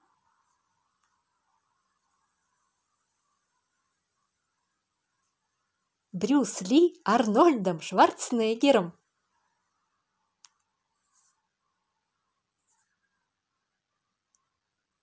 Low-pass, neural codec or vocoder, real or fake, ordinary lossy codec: none; none; real; none